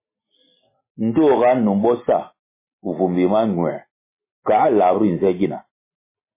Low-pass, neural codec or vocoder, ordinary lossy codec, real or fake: 3.6 kHz; none; MP3, 16 kbps; real